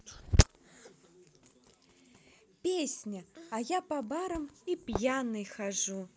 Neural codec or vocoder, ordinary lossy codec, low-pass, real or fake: none; none; none; real